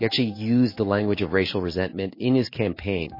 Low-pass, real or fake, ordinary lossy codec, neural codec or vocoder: 5.4 kHz; fake; MP3, 24 kbps; codec, 16 kHz in and 24 kHz out, 1 kbps, XY-Tokenizer